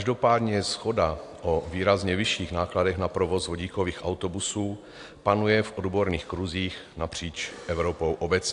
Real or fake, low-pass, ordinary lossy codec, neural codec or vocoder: real; 10.8 kHz; AAC, 64 kbps; none